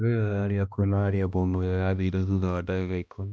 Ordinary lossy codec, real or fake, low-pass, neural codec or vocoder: none; fake; none; codec, 16 kHz, 1 kbps, X-Codec, HuBERT features, trained on balanced general audio